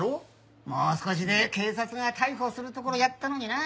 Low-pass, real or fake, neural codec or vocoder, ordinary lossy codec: none; real; none; none